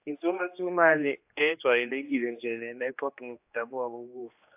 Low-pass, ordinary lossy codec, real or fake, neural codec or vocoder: 3.6 kHz; AAC, 32 kbps; fake; codec, 16 kHz, 2 kbps, X-Codec, HuBERT features, trained on general audio